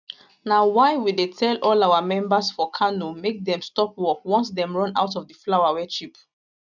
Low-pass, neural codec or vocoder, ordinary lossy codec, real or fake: 7.2 kHz; none; none; real